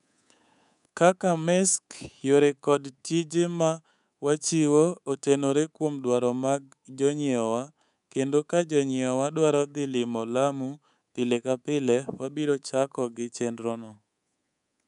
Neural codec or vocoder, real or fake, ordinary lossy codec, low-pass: codec, 24 kHz, 3.1 kbps, DualCodec; fake; none; 10.8 kHz